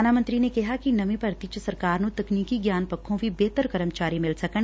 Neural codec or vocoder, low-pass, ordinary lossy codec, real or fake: none; none; none; real